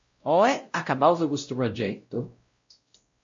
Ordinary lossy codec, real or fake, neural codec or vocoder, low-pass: MP3, 48 kbps; fake; codec, 16 kHz, 0.5 kbps, X-Codec, WavLM features, trained on Multilingual LibriSpeech; 7.2 kHz